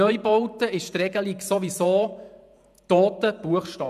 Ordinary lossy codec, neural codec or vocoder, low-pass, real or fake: none; vocoder, 48 kHz, 128 mel bands, Vocos; 14.4 kHz; fake